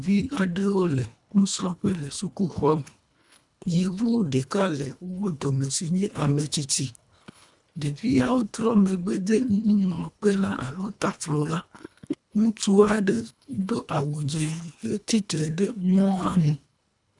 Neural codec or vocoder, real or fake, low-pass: codec, 24 kHz, 1.5 kbps, HILCodec; fake; 10.8 kHz